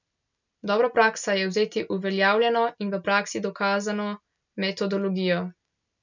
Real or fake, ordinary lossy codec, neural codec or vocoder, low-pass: real; none; none; 7.2 kHz